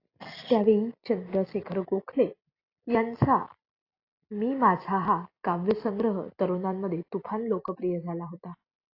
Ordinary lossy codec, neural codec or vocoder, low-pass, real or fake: AAC, 32 kbps; none; 5.4 kHz; real